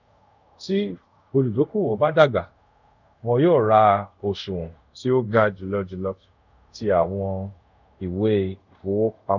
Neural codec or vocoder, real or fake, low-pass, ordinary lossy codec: codec, 24 kHz, 0.5 kbps, DualCodec; fake; 7.2 kHz; none